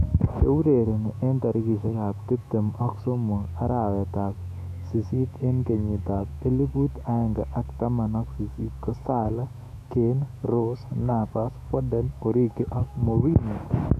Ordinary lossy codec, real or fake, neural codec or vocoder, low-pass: AAC, 96 kbps; fake; autoencoder, 48 kHz, 128 numbers a frame, DAC-VAE, trained on Japanese speech; 14.4 kHz